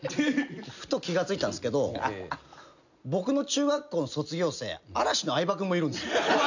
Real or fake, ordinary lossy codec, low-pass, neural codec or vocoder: real; none; 7.2 kHz; none